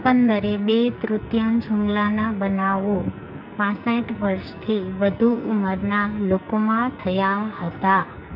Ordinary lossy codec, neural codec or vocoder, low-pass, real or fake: none; codec, 44.1 kHz, 2.6 kbps, SNAC; 5.4 kHz; fake